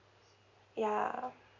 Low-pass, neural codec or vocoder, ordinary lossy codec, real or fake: 7.2 kHz; none; none; real